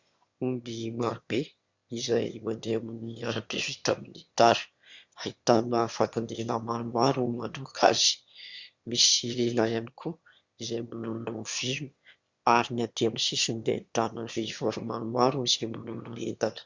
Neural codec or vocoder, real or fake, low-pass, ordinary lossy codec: autoencoder, 22.05 kHz, a latent of 192 numbers a frame, VITS, trained on one speaker; fake; 7.2 kHz; Opus, 64 kbps